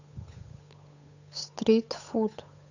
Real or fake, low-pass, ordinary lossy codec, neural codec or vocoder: fake; 7.2 kHz; none; codec, 16 kHz, 8 kbps, FunCodec, trained on Chinese and English, 25 frames a second